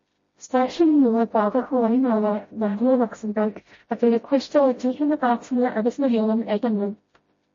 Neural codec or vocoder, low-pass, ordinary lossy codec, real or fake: codec, 16 kHz, 0.5 kbps, FreqCodec, smaller model; 7.2 kHz; MP3, 32 kbps; fake